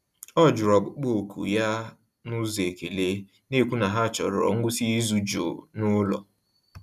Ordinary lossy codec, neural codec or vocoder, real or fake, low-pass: none; none; real; 14.4 kHz